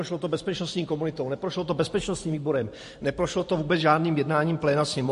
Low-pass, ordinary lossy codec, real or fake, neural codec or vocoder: 14.4 kHz; MP3, 48 kbps; fake; vocoder, 44.1 kHz, 128 mel bands every 512 samples, BigVGAN v2